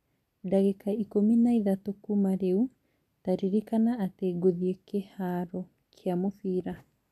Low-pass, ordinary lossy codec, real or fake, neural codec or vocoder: 14.4 kHz; none; real; none